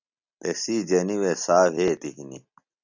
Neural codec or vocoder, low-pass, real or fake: none; 7.2 kHz; real